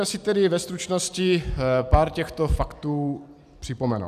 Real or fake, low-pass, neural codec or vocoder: real; 14.4 kHz; none